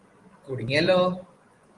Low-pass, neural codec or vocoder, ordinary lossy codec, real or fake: 10.8 kHz; vocoder, 24 kHz, 100 mel bands, Vocos; Opus, 24 kbps; fake